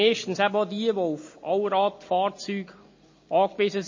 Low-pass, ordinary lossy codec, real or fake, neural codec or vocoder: 7.2 kHz; MP3, 32 kbps; real; none